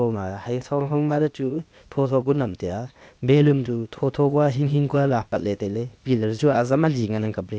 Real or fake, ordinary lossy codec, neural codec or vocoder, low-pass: fake; none; codec, 16 kHz, 0.8 kbps, ZipCodec; none